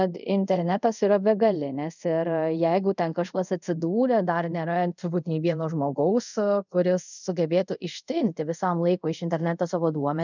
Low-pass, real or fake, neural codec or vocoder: 7.2 kHz; fake; codec, 24 kHz, 0.5 kbps, DualCodec